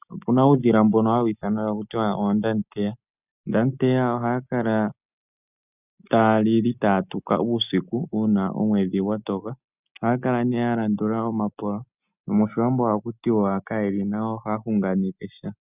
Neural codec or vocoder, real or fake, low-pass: none; real; 3.6 kHz